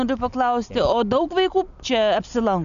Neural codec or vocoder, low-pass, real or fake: none; 7.2 kHz; real